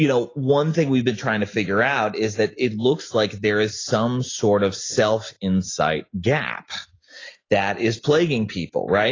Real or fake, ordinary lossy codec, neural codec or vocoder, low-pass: real; AAC, 32 kbps; none; 7.2 kHz